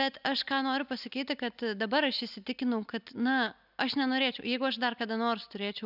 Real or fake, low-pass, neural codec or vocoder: real; 5.4 kHz; none